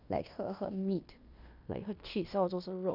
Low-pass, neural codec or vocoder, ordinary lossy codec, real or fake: 5.4 kHz; codec, 16 kHz in and 24 kHz out, 0.9 kbps, LongCat-Audio-Codec, fine tuned four codebook decoder; none; fake